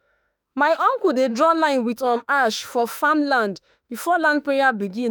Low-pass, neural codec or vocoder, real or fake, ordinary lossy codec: none; autoencoder, 48 kHz, 32 numbers a frame, DAC-VAE, trained on Japanese speech; fake; none